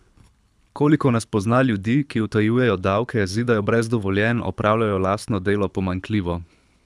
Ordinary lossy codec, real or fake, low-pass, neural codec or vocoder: none; fake; none; codec, 24 kHz, 6 kbps, HILCodec